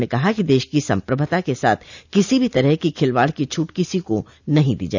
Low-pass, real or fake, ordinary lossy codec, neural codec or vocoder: 7.2 kHz; real; none; none